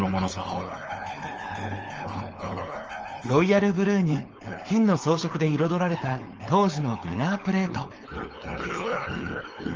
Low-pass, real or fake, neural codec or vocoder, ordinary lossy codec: 7.2 kHz; fake; codec, 16 kHz, 4.8 kbps, FACodec; Opus, 24 kbps